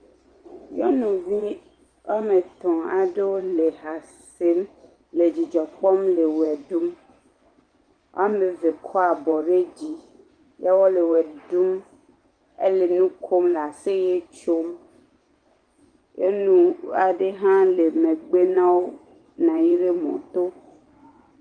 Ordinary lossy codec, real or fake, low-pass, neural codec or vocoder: Opus, 24 kbps; fake; 9.9 kHz; vocoder, 24 kHz, 100 mel bands, Vocos